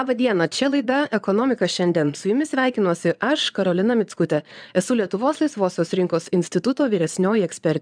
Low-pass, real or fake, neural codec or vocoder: 9.9 kHz; fake; vocoder, 22.05 kHz, 80 mel bands, Vocos